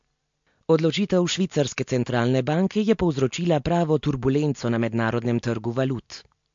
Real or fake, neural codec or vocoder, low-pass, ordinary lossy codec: real; none; 7.2 kHz; MP3, 48 kbps